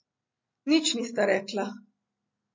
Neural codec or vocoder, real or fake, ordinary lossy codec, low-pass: none; real; MP3, 32 kbps; 7.2 kHz